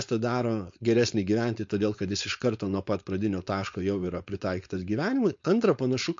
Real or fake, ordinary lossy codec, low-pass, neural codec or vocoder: fake; MP3, 48 kbps; 7.2 kHz; codec, 16 kHz, 4.8 kbps, FACodec